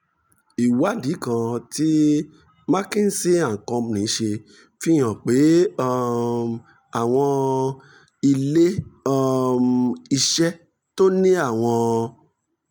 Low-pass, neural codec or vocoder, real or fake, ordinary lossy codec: none; none; real; none